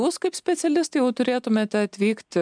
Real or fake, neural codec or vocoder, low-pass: real; none; 9.9 kHz